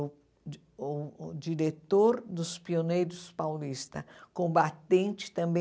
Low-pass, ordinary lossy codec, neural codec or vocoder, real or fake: none; none; none; real